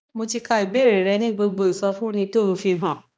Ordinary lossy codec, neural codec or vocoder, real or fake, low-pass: none; codec, 16 kHz, 1 kbps, X-Codec, HuBERT features, trained on balanced general audio; fake; none